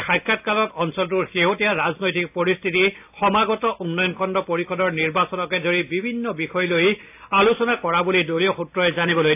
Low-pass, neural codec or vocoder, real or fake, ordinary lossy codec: 3.6 kHz; none; real; AAC, 32 kbps